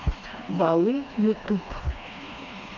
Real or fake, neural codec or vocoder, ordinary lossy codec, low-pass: fake; codec, 16 kHz, 2 kbps, FreqCodec, smaller model; none; 7.2 kHz